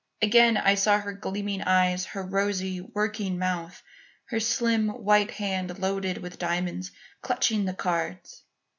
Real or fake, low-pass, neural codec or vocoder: real; 7.2 kHz; none